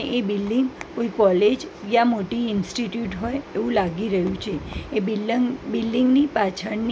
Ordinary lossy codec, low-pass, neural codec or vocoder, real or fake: none; none; none; real